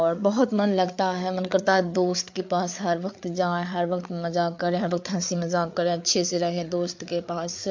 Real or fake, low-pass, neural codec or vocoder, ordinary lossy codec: fake; 7.2 kHz; codec, 16 kHz, 8 kbps, FreqCodec, larger model; MP3, 48 kbps